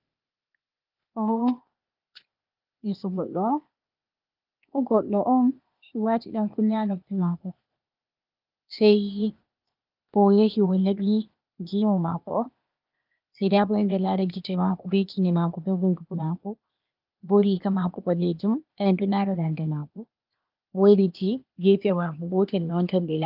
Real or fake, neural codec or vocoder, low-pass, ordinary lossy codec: fake; codec, 16 kHz, 0.8 kbps, ZipCodec; 5.4 kHz; Opus, 24 kbps